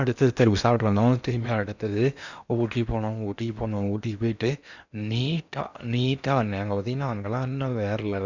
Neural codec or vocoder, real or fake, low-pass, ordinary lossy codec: codec, 16 kHz in and 24 kHz out, 0.8 kbps, FocalCodec, streaming, 65536 codes; fake; 7.2 kHz; none